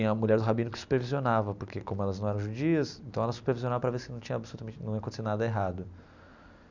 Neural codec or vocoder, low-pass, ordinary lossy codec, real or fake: none; 7.2 kHz; none; real